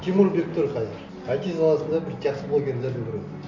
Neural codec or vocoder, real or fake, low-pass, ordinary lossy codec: none; real; 7.2 kHz; none